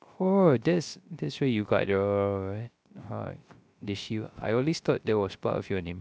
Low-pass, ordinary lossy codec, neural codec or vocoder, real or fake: none; none; codec, 16 kHz, 0.3 kbps, FocalCodec; fake